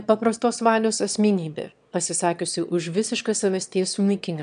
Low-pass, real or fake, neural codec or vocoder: 9.9 kHz; fake; autoencoder, 22.05 kHz, a latent of 192 numbers a frame, VITS, trained on one speaker